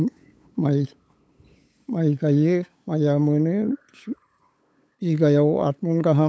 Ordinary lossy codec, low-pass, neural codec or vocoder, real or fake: none; none; codec, 16 kHz, 8 kbps, FunCodec, trained on LibriTTS, 25 frames a second; fake